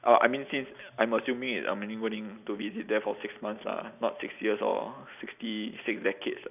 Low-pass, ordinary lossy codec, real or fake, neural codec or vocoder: 3.6 kHz; none; real; none